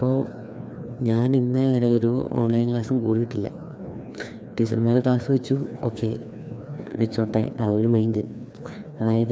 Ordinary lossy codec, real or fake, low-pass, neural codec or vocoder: none; fake; none; codec, 16 kHz, 2 kbps, FreqCodec, larger model